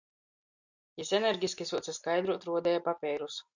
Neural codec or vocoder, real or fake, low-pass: none; real; 7.2 kHz